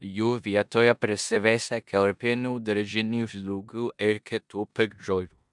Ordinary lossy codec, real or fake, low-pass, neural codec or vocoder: MP3, 96 kbps; fake; 10.8 kHz; codec, 16 kHz in and 24 kHz out, 0.9 kbps, LongCat-Audio-Codec, four codebook decoder